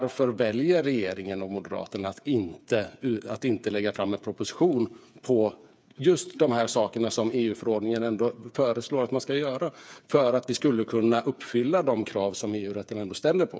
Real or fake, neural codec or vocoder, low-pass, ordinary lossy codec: fake; codec, 16 kHz, 8 kbps, FreqCodec, smaller model; none; none